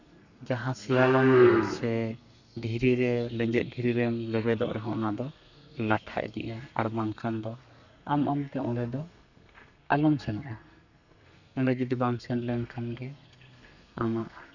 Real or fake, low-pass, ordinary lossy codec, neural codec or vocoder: fake; 7.2 kHz; none; codec, 32 kHz, 1.9 kbps, SNAC